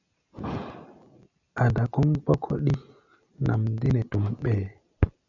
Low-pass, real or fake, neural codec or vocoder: 7.2 kHz; real; none